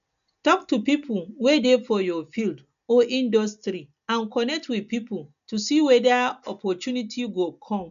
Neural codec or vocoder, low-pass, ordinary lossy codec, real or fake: none; 7.2 kHz; none; real